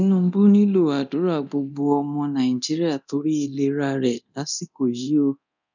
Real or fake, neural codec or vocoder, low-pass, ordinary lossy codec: fake; codec, 24 kHz, 0.9 kbps, DualCodec; 7.2 kHz; none